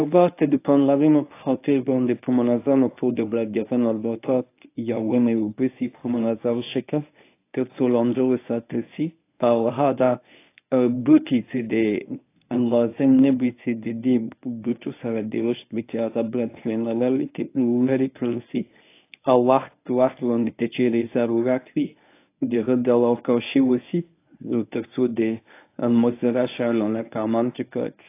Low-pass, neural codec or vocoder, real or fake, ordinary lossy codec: 3.6 kHz; codec, 24 kHz, 0.9 kbps, WavTokenizer, medium speech release version 1; fake; AAC, 24 kbps